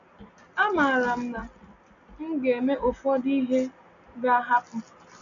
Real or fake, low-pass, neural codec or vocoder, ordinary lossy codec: real; 7.2 kHz; none; none